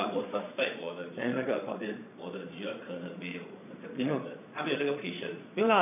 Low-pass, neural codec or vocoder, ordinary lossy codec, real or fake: 3.6 kHz; vocoder, 22.05 kHz, 80 mel bands, Vocos; none; fake